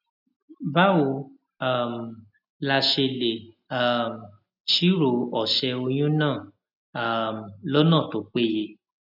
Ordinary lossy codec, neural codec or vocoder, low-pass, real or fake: none; none; 5.4 kHz; real